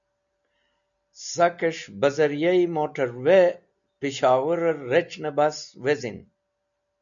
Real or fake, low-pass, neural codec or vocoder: real; 7.2 kHz; none